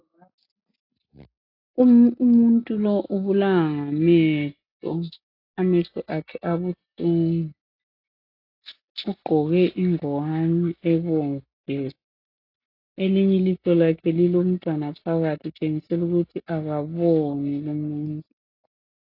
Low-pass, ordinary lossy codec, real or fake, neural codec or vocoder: 5.4 kHz; AAC, 32 kbps; real; none